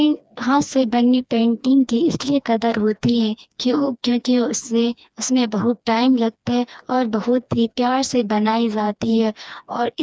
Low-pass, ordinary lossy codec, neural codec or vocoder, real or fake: none; none; codec, 16 kHz, 2 kbps, FreqCodec, smaller model; fake